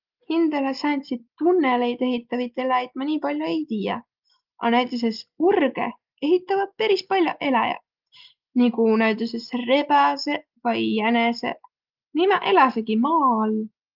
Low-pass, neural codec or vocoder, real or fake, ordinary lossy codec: 5.4 kHz; none; real; Opus, 32 kbps